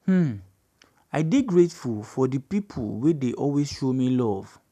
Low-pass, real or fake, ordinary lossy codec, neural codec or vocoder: 14.4 kHz; real; none; none